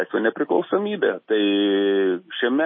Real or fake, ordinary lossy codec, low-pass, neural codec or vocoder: real; MP3, 24 kbps; 7.2 kHz; none